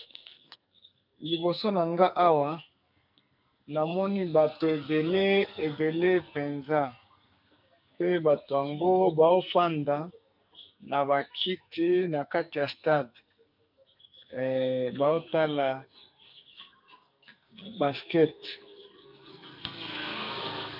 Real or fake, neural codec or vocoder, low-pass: fake; codec, 44.1 kHz, 2.6 kbps, SNAC; 5.4 kHz